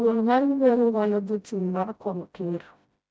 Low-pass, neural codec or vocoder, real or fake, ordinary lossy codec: none; codec, 16 kHz, 0.5 kbps, FreqCodec, smaller model; fake; none